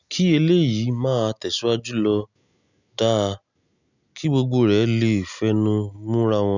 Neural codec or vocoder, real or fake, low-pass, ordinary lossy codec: none; real; 7.2 kHz; none